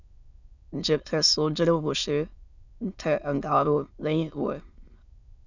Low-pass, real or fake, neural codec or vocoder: 7.2 kHz; fake; autoencoder, 22.05 kHz, a latent of 192 numbers a frame, VITS, trained on many speakers